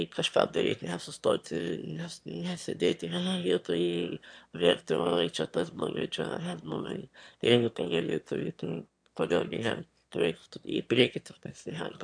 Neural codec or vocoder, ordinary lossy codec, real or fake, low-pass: autoencoder, 22.05 kHz, a latent of 192 numbers a frame, VITS, trained on one speaker; MP3, 64 kbps; fake; 9.9 kHz